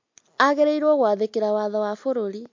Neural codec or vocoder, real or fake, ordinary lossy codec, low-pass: none; real; MP3, 48 kbps; 7.2 kHz